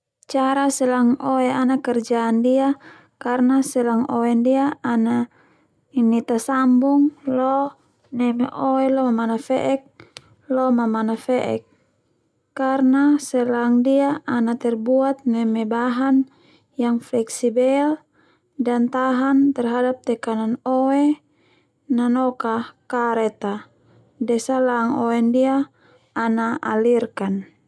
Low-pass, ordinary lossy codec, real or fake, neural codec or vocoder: none; none; real; none